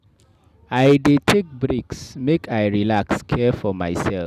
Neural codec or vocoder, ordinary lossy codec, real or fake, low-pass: none; none; real; 14.4 kHz